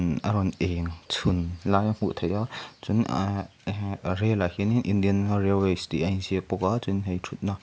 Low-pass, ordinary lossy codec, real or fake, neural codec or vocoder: none; none; real; none